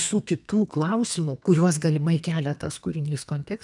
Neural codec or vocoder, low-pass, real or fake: codec, 32 kHz, 1.9 kbps, SNAC; 10.8 kHz; fake